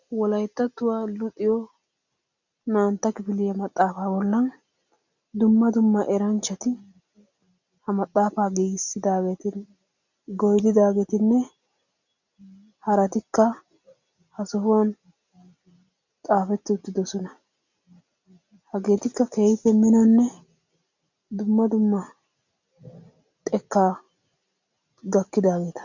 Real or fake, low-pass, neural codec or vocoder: real; 7.2 kHz; none